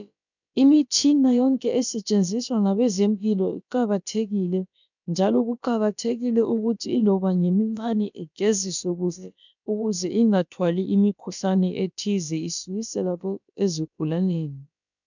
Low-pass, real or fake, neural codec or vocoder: 7.2 kHz; fake; codec, 16 kHz, about 1 kbps, DyCAST, with the encoder's durations